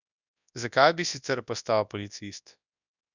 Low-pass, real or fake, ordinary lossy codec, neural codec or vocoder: 7.2 kHz; fake; none; codec, 24 kHz, 0.9 kbps, WavTokenizer, large speech release